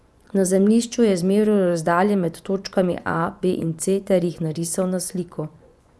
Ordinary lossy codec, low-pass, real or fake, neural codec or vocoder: none; none; fake; vocoder, 24 kHz, 100 mel bands, Vocos